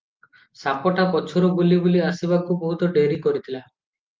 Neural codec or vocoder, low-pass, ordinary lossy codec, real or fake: none; 7.2 kHz; Opus, 32 kbps; real